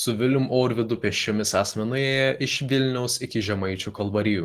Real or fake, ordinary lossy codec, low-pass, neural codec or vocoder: real; Opus, 16 kbps; 14.4 kHz; none